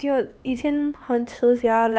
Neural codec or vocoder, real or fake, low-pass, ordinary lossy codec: codec, 16 kHz, 2 kbps, X-Codec, HuBERT features, trained on LibriSpeech; fake; none; none